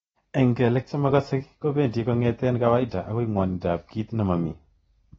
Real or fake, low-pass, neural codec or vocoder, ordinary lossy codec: real; 19.8 kHz; none; AAC, 24 kbps